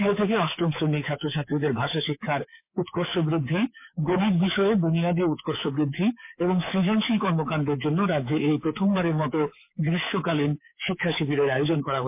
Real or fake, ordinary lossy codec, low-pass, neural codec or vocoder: fake; MP3, 24 kbps; 3.6 kHz; codec, 24 kHz, 3.1 kbps, DualCodec